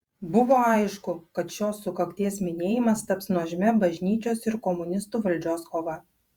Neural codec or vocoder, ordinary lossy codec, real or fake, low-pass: vocoder, 48 kHz, 128 mel bands, Vocos; Opus, 64 kbps; fake; 19.8 kHz